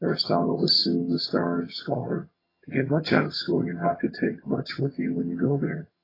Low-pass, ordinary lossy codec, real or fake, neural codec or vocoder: 5.4 kHz; AAC, 24 kbps; fake; vocoder, 22.05 kHz, 80 mel bands, HiFi-GAN